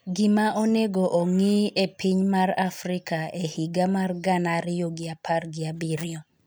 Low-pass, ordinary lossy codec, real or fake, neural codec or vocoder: none; none; real; none